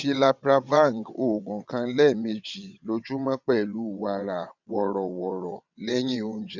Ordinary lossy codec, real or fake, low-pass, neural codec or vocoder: none; fake; 7.2 kHz; vocoder, 22.05 kHz, 80 mel bands, WaveNeXt